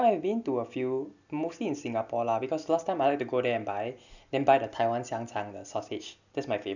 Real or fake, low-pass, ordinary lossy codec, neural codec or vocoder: real; 7.2 kHz; none; none